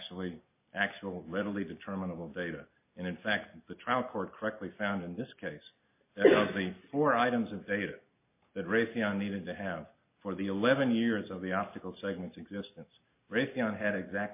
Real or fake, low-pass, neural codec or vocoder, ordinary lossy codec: real; 3.6 kHz; none; MP3, 24 kbps